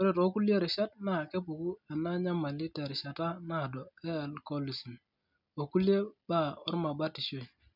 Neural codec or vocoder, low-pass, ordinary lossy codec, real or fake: none; 5.4 kHz; none; real